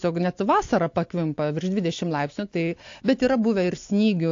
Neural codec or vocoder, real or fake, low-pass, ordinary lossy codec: none; real; 7.2 kHz; AAC, 48 kbps